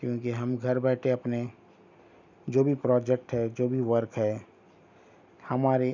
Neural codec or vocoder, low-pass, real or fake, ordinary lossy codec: none; 7.2 kHz; real; none